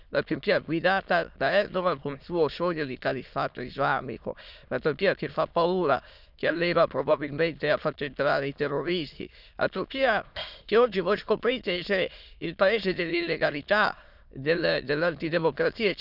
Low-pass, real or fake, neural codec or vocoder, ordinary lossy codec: 5.4 kHz; fake; autoencoder, 22.05 kHz, a latent of 192 numbers a frame, VITS, trained on many speakers; none